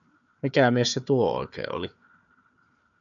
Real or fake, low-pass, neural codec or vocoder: fake; 7.2 kHz; codec, 16 kHz, 4 kbps, FunCodec, trained on Chinese and English, 50 frames a second